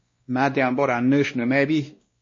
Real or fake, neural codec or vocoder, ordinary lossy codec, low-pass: fake; codec, 16 kHz, 1 kbps, X-Codec, WavLM features, trained on Multilingual LibriSpeech; MP3, 32 kbps; 7.2 kHz